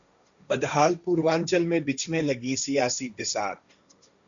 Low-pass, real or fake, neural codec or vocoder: 7.2 kHz; fake; codec, 16 kHz, 1.1 kbps, Voila-Tokenizer